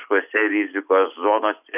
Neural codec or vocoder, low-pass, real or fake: none; 3.6 kHz; real